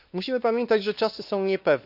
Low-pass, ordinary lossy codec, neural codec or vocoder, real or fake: 5.4 kHz; none; codec, 16 kHz, 2 kbps, X-Codec, WavLM features, trained on Multilingual LibriSpeech; fake